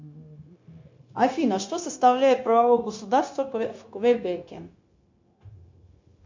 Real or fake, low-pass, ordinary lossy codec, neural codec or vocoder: fake; 7.2 kHz; MP3, 48 kbps; codec, 16 kHz, 0.9 kbps, LongCat-Audio-Codec